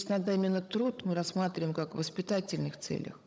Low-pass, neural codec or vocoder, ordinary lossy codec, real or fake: none; codec, 16 kHz, 16 kbps, FunCodec, trained on Chinese and English, 50 frames a second; none; fake